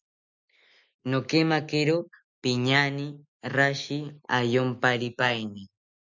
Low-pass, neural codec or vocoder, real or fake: 7.2 kHz; none; real